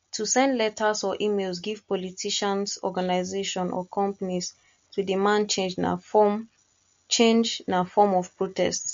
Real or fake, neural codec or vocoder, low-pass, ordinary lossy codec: real; none; 7.2 kHz; MP3, 48 kbps